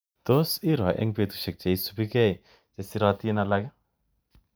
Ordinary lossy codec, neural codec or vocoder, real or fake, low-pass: none; none; real; none